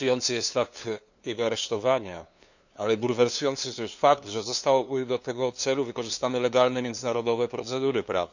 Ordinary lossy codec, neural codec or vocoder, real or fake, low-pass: none; codec, 16 kHz, 2 kbps, FunCodec, trained on LibriTTS, 25 frames a second; fake; 7.2 kHz